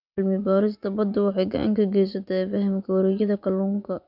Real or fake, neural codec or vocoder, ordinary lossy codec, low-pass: fake; vocoder, 24 kHz, 100 mel bands, Vocos; none; 5.4 kHz